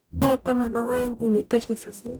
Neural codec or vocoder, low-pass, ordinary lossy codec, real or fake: codec, 44.1 kHz, 0.9 kbps, DAC; none; none; fake